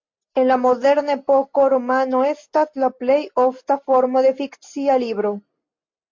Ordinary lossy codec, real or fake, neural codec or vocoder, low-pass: MP3, 48 kbps; real; none; 7.2 kHz